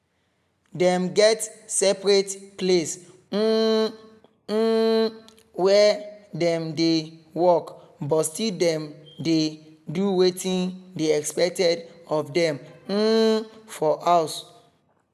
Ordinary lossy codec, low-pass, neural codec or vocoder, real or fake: none; 14.4 kHz; none; real